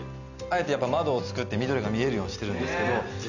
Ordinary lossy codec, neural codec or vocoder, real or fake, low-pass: none; none; real; 7.2 kHz